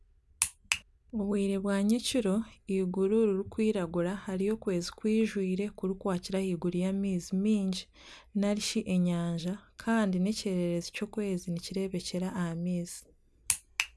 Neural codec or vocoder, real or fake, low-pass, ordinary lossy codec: none; real; none; none